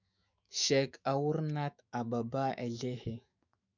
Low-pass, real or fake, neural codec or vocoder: 7.2 kHz; fake; autoencoder, 48 kHz, 128 numbers a frame, DAC-VAE, trained on Japanese speech